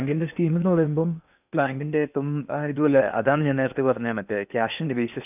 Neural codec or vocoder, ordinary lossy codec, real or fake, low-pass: codec, 16 kHz in and 24 kHz out, 0.8 kbps, FocalCodec, streaming, 65536 codes; none; fake; 3.6 kHz